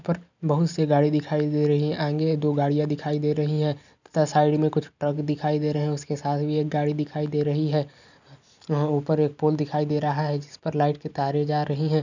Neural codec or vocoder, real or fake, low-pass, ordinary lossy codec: none; real; 7.2 kHz; none